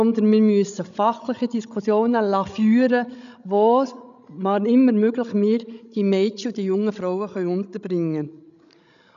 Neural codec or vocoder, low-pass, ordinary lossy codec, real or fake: codec, 16 kHz, 16 kbps, FreqCodec, larger model; 7.2 kHz; none; fake